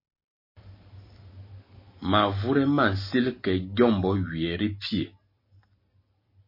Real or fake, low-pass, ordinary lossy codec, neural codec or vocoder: real; 5.4 kHz; MP3, 24 kbps; none